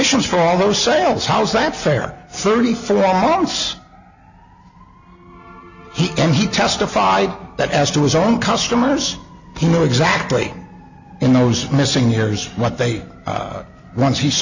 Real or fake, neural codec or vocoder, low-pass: real; none; 7.2 kHz